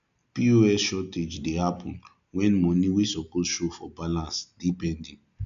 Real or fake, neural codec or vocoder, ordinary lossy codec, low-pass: real; none; AAC, 96 kbps; 7.2 kHz